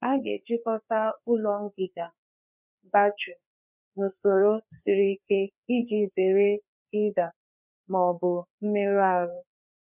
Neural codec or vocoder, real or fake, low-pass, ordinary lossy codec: codec, 16 kHz, 4 kbps, FreqCodec, larger model; fake; 3.6 kHz; none